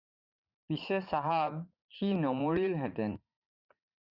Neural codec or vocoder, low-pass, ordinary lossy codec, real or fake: vocoder, 44.1 kHz, 80 mel bands, Vocos; 5.4 kHz; Opus, 64 kbps; fake